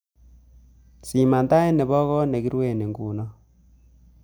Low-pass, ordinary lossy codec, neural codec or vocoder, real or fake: none; none; none; real